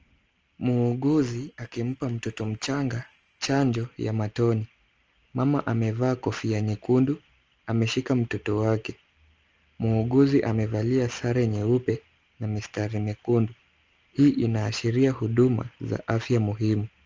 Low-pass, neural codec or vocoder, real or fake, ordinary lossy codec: 7.2 kHz; none; real; Opus, 24 kbps